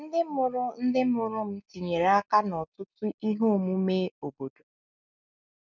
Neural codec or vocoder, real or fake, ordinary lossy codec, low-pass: none; real; none; 7.2 kHz